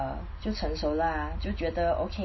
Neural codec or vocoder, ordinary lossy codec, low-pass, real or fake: none; MP3, 24 kbps; 7.2 kHz; real